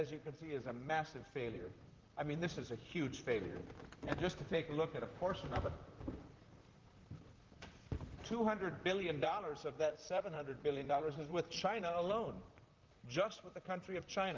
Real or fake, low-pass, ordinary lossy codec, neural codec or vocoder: fake; 7.2 kHz; Opus, 24 kbps; vocoder, 44.1 kHz, 128 mel bands, Pupu-Vocoder